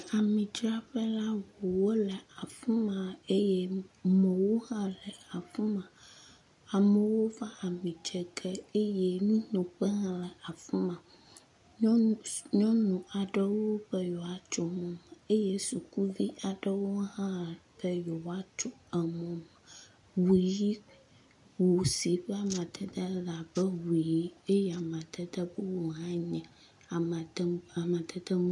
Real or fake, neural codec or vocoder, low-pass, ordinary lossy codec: real; none; 10.8 kHz; MP3, 96 kbps